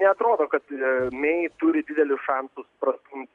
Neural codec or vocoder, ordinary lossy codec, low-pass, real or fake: none; MP3, 96 kbps; 10.8 kHz; real